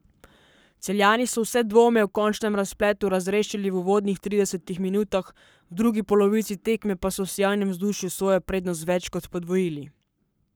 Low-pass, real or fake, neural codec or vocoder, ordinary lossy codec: none; fake; codec, 44.1 kHz, 7.8 kbps, Pupu-Codec; none